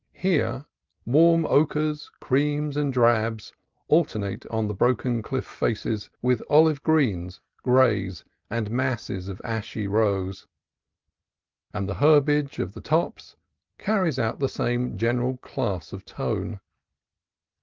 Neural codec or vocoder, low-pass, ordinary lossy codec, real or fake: none; 7.2 kHz; Opus, 32 kbps; real